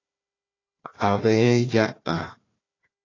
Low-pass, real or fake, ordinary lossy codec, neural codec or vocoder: 7.2 kHz; fake; AAC, 32 kbps; codec, 16 kHz, 1 kbps, FunCodec, trained on Chinese and English, 50 frames a second